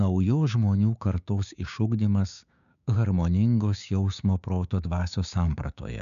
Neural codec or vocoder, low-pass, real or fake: codec, 16 kHz, 6 kbps, DAC; 7.2 kHz; fake